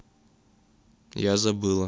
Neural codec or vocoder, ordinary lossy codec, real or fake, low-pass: none; none; real; none